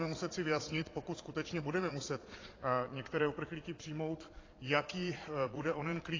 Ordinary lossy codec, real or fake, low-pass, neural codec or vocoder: AAC, 32 kbps; fake; 7.2 kHz; vocoder, 22.05 kHz, 80 mel bands, Vocos